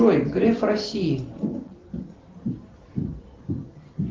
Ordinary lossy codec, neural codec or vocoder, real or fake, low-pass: Opus, 16 kbps; none; real; 7.2 kHz